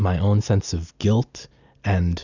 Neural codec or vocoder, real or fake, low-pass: none; real; 7.2 kHz